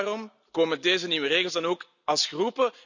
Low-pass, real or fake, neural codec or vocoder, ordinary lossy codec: 7.2 kHz; real; none; none